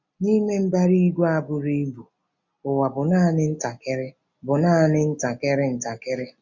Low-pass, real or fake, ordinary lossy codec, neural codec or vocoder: 7.2 kHz; real; none; none